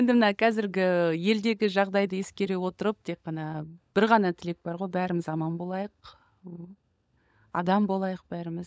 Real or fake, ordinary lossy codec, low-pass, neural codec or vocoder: fake; none; none; codec, 16 kHz, 16 kbps, FunCodec, trained on LibriTTS, 50 frames a second